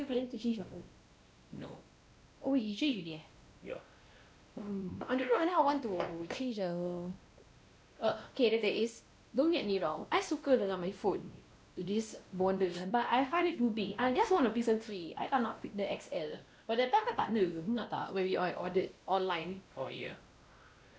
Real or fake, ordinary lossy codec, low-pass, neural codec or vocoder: fake; none; none; codec, 16 kHz, 1 kbps, X-Codec, WavLM features, trained on Multilingual LibriSpeech